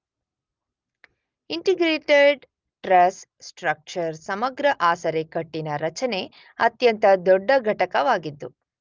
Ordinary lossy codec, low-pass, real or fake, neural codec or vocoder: Opus, 24 kbps; 7.2 kHz; real; none